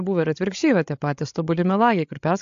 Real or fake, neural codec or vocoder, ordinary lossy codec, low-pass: fake; codec, 16 kHz, 16 kbps, FreqCodec, larger model; AAC, 64 kbps; 7.2 kHz